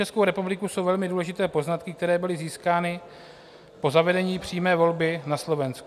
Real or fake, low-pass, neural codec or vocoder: real; 14.4 kHz; none